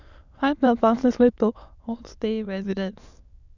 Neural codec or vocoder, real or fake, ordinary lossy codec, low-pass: autoencoder, 22.05 kHz, a latent of 192 numbers a frame, VITS, trained on many speakers; fake; none; 7.2 kHz